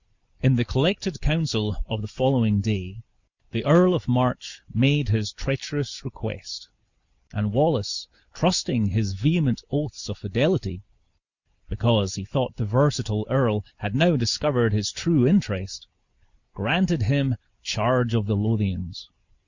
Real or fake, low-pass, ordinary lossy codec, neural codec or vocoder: real; 7.2 kHz; Opus, 64 kbps; none